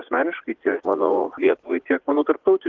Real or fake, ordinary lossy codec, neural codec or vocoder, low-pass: fake; Opus, 16 kbps; vocoder, 44.1 kHz, 80 mel bands, Vocos; 7.2 kHz